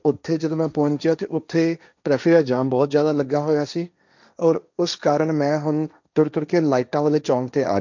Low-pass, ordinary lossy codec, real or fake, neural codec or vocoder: 7.2 kHz; none; fake; codec, 16 kHz, 1.1 kbps, Voila-Tokenizer